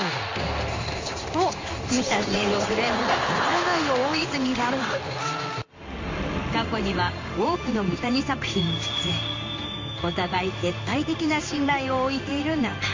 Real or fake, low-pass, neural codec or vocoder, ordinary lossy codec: fake; 7.2 kHz; codec, 16 kHz in and 24 kHz out, 1 kbps, XY-Tokenizer; MP3, 64 kbps